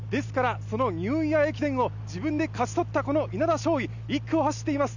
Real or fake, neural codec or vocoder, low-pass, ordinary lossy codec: real; none; 7.2 kHz; none